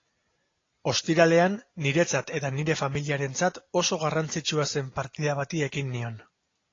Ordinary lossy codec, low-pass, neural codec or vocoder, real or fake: AAC, 32 kbps; 7.2 kHz; none; real